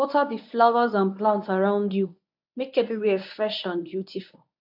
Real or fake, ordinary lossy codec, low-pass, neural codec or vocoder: fake; AAC, 48 kbps; 5.4 kHz; codec, 24 kHz, 0.9 kbps, WavTokenizer, medium speech release version 1